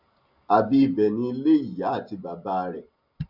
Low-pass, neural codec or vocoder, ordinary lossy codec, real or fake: 5.4 kHz; none; none; real